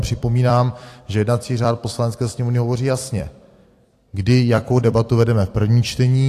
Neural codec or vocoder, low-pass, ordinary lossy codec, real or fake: vocoder, 44.1 kHz, 128 mel bands every 256 samples, BigVGAN v2; 14.4 kHz; AAC, 64 kbps; fake